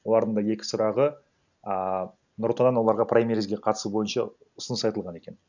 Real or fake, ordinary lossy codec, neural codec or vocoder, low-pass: real; none; none; 7.2 kHz